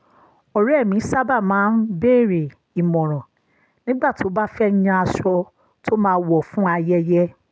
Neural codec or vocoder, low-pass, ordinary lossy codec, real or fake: none; none; none; real